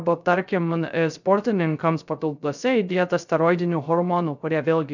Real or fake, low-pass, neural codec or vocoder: fake; 7.2 kHz; codec, 16 kHz, 0.3 kbps, FocalCodec